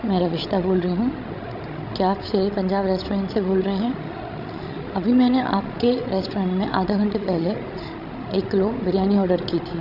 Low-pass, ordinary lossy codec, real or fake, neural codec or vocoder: 5.4 kHz; none; fake; codec, 16 kHz, 16 kbps, FreqCodec, larger model